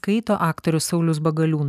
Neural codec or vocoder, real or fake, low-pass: none; real; 14.4 kHz